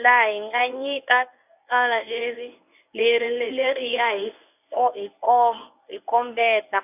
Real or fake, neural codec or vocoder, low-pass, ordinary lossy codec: fake; codec, 24 kHz, 0.9 kbps, WavTokenizer, medium speech release version 1; 3.6 kHz; none